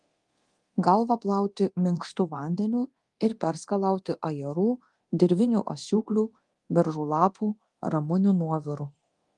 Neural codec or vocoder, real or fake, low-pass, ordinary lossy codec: codec, 24 kHz, 0.9 kbps, DualCodec; fake; 10.8 kHz; Opus, 24 kbps